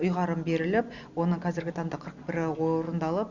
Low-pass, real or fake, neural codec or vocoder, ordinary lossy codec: 7.2 kHz; real; none; none